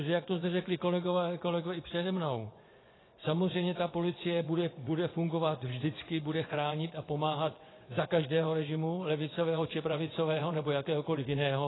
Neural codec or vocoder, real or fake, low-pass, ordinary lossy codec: vocoder, 24 kHz, 100 mel bands, Vocos; fake; 7.2 kHz; AAC, 16 kbps